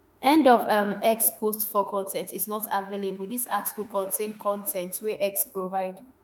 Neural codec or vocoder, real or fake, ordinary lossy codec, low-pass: autoencoder, 48 kHz, 32 numbers a frame, DAC-VAE, trained on Japanese speech; fake; none; none